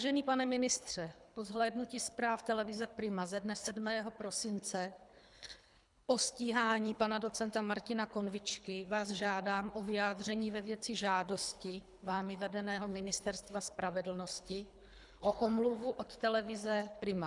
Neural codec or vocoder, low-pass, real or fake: codec, 24 kHz, 3 kbps, HILCodec; 10.8 kHz; fake